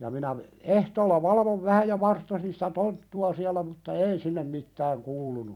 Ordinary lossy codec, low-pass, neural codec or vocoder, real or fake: none; 19.8 kHz; vocoder, 44.1 kHz, 128 mel bands every 256 samples, BigVGAN v2; fake